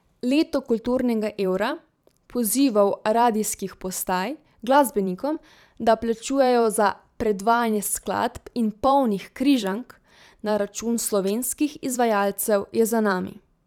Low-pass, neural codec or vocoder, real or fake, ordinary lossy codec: 19.8 kHz; vocoder, 44.1 kHz, 128 mel bands every 512 samples, BigVGAN v2; fake; none